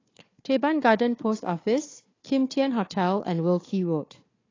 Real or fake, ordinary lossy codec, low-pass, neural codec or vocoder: fake; AAC, 32 kbps; 7.2 kHz; codec, 16 kHz, 4 kbps, FunCodec, trained on Chinese and English, 50 frames a second